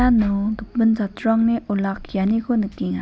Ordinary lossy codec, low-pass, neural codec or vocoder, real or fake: none; none; none; real